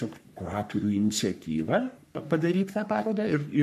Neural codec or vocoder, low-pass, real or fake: codec, 44.1 kHz, 3.4 kbps, Pupu-Codec; 14.4 kHz; fake